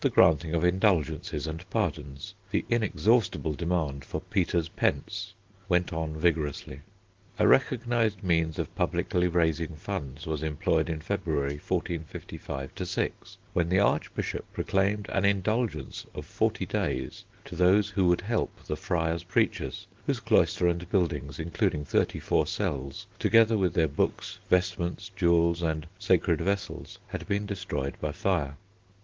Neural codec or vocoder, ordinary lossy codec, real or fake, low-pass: none; Opus, 16 kbps; real; 7.2 kHz